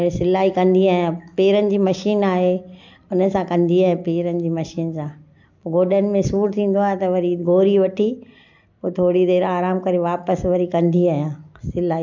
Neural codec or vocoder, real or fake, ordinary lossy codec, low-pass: none; real; MP3, 64 kbps; 7.2 kHz